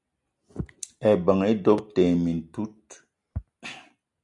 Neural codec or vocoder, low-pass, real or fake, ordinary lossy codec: none; 10.8 kHz; real; AAC, 64 kbps